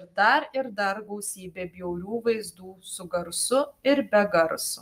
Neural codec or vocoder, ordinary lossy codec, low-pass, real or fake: none; Opus, 32 kbps; 14.4 kHz; real